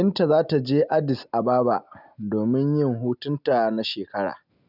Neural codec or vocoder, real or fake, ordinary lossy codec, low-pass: none; real; none; 5.4 kHz